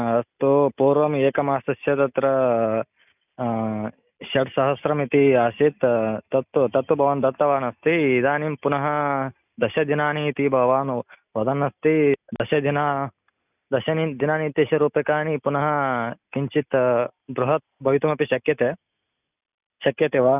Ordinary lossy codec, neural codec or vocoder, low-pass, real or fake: none; none; 3.6 kHz; real